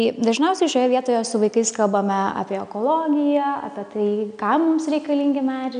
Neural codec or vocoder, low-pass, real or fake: none; 9.9 kHz; real